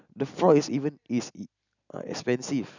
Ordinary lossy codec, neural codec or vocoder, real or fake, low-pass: none; none; real; 7.2 kHz